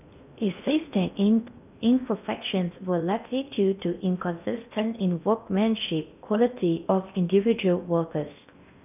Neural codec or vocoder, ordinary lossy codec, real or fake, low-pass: codec, 16 kHz in and 24 kHz out, 0.6 kbps, FocalCodec, streaming, 4096 codes; none; fake; 3.6 kHz